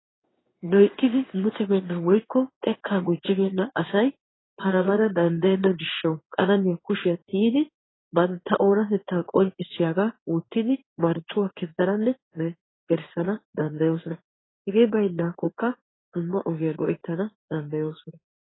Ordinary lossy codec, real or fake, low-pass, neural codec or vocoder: AAC, 16 kbps; fake; 7.2 kHz; codec, 16 kHz in and 24 kHz out, 1 kbps, XY-Tokenizer